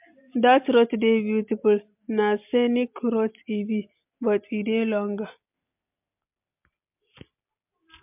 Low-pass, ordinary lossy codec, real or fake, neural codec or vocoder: 3.6 kHz; MP3, 32 kbps; real; none